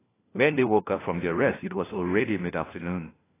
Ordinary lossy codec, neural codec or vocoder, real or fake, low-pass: AAC, 16 kbps; codec, 16 kHz, 1 kbps, FunCodec, trained on LibriTTS, 50 frames a second; fake; 3.6 kHz